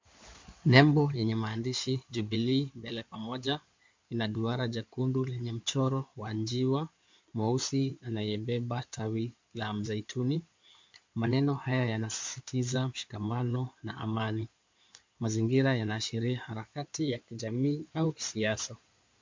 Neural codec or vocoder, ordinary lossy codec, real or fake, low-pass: codec, 16 kHz in and 24 kHz out, 2.2 kbps, FireRedTTS-2 codec; MP3, 64 kbps; fake; 7.2 kHz